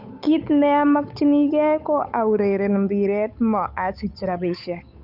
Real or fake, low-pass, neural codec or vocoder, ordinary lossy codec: fake; 5.4 kHz; codec, 16 kHz, 8 kbps, FunCodec, trained on Chinese and English, 25 frames a second; none